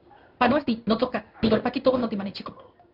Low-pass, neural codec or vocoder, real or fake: 5.4 kHz; codec, 16 kHz in and 24 kHz out, 1 kbps, XY-Tokenizer; fake